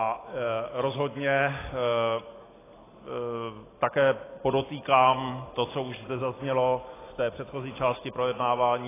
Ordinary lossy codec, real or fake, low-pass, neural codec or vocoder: AAC, 16 kbps; real; 3.6 kHz; none